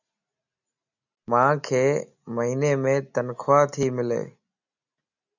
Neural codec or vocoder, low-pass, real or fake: none; 7.2 kHz; real